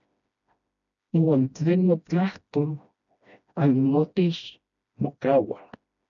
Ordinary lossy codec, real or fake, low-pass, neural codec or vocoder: MP3, 96 kbps; fake; 7.2 kHz; codec, 16 kHz, 1 kbps, FreqCodec, smaller model